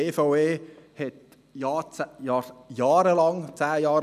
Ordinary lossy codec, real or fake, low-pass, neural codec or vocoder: none; real; 14.4 kHz; none